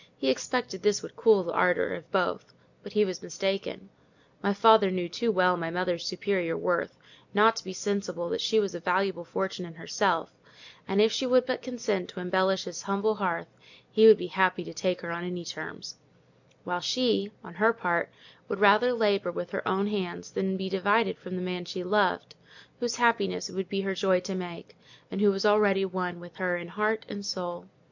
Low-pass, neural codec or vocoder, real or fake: 7.2 kHz; none; real